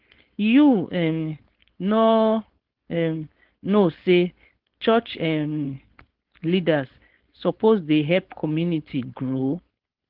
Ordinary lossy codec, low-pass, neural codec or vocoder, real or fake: Opus, 16 kbps; 5.4 kHz; codec, 16 kHz, 4.8 kbps, FACodec; fake